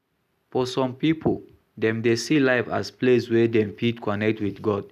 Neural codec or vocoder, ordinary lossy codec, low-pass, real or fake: none; none; 14.4 kHz; real